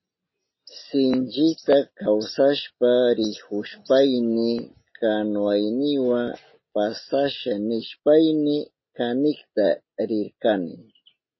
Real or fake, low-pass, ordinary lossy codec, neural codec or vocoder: real; 7.2 kHz; MP3, 24 kbps; none